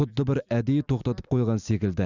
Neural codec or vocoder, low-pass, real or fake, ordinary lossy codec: none; 7.2 kHz; real; none